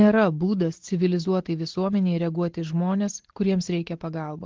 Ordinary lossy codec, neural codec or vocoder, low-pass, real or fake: Opus, 16 kbps; none; 7.2 kHz; real